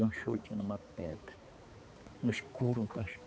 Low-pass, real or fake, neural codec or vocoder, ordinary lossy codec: none; fake; codec, 16 kHz, 4 kbps, X-Codec, HuBERT features, trained on balanced general audio; none